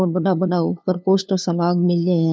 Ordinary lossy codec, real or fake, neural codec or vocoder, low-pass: none; fake; codec, 16 kHz, 2 kbps, FunCodec, trained on LibriTTS, 25 frames a second; none